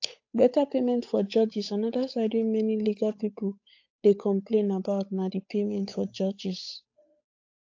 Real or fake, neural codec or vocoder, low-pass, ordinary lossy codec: fake; codec, 16 kHz, 8 kbps, FunCodec, trained on Chinese and English, 25 frames a second; 7.2 kHz; AAC, 48 kbps